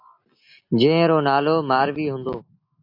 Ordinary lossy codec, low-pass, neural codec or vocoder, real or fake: AAC, 32 kbps; 5.4 kHz; none; real